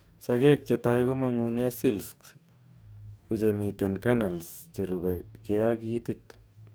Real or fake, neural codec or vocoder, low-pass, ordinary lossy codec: fake; codec, 44.1 kHz, 2.6 kbps, DAC; none; none